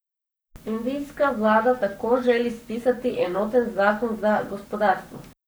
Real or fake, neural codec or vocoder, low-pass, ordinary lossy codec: fake; codec, 44.1 kHz, 7.8 kbps, Pupu-Codec; none; none